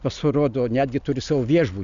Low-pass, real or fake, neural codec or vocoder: 7.2 kHz; real; none